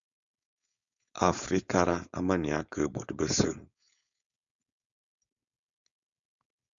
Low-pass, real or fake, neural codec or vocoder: 7.2 kHz; fake; codec, 16 kHz, 4.8 kbps, FACodec